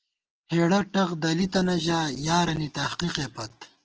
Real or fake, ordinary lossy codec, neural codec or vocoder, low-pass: real; Opus, 16 kbps; none; 7.2 kHz